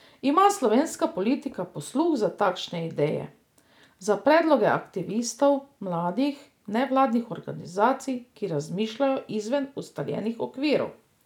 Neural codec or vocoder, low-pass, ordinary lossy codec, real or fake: none; 19.8 kHz; none; real